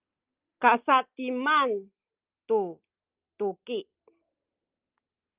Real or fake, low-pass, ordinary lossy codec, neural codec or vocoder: real; 3.6 kHz; Opus, 32 kbps; none